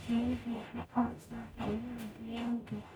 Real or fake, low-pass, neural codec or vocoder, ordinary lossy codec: fake; none; codec, 44.1 kHz, 0.9 kbps, DAC; none